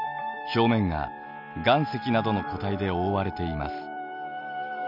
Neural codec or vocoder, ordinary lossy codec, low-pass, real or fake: none; none; 5.4 kHz; real